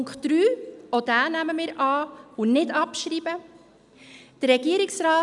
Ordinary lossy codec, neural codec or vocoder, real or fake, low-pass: none; none; real; 10.8 kHz